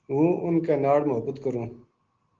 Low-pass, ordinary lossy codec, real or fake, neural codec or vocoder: 7.2 kHz; Opus, 16 kbps; real; none